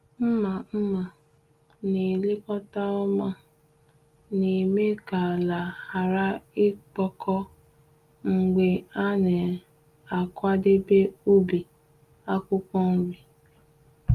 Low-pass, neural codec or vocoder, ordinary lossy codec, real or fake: 14.4 kHz; none; Opus, 32 kbps; real